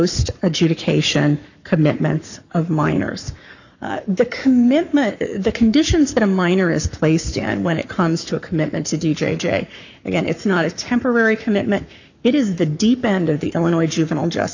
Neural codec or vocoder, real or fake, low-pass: codec, 44.1 kHz, 7.8 kbps, Pupu-Codec; fake; 7.2 kHz